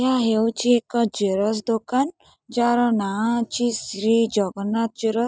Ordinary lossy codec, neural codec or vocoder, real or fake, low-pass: none; none; real; none